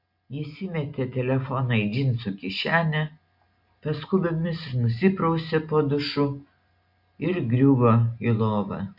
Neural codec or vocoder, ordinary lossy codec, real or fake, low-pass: none; MP3, 48 kbps; real; 5.4 kHz